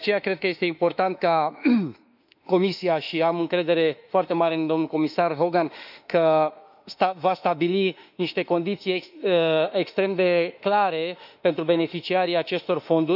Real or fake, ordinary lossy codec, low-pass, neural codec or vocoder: fake; none; 5.4 kHz; autoencoder, 48 kHz, 32 numbers a frame, DAC-VAE, trained on Japanese speech